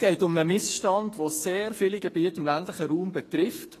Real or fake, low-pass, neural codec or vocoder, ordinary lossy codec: fake; 14.4 kHz; codec, 44.1 kHz, 2.6 kbps, SNAC; AAC, 48 kbps